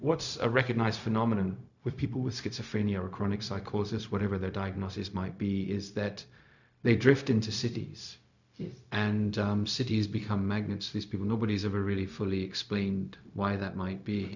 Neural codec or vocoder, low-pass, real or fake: codec, 16 kHz, 0.4 kbps, LongCat-Audio-Codec; 7.2 kHz; fake